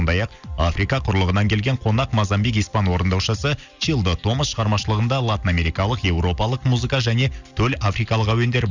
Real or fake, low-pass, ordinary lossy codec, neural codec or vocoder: real; 7.2 kHz; Opus, 64 kbps; none